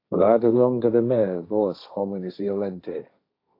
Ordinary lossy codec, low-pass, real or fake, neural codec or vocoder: AAC, 32 kbps; 5.4 kHz; fake; codec, 16 kHz, 1.1 kbps, Voila-Tokenizer